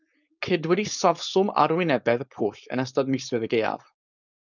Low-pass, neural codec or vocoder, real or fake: 7.2 kHz; codec, 16 kHz, 4.8 kbps, FACodec; fake